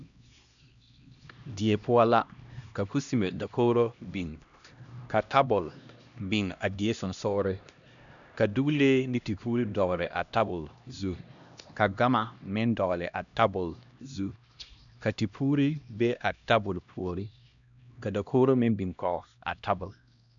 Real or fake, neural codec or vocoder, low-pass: fake; codec, 16 kHz, 1 kbps, X-Codec, HuBERT features, trained on LibriSpeech; 7.2 kHz